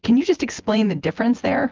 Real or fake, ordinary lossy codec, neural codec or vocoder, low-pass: fake; Opus, 32 kbps; vocoder, 24 kHz, 100 mel bands, Vocos; 7.2 kHz